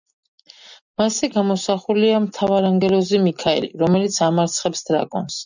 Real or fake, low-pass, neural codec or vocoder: real; 7.2 kHz; none